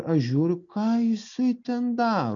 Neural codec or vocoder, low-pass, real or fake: none; 7.2 kHz; real